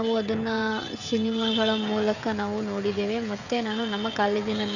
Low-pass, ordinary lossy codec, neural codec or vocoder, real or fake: 7.2 kHz; none; codec, 16 kHz, 16 kbps, FreqCodec, smaller model; fake